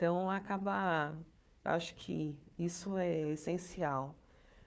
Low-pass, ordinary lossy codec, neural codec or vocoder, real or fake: none; none; codec, 16 kHz, 4 kbps, FunCodec, trained on Chinese and English, 50 frames a second; fake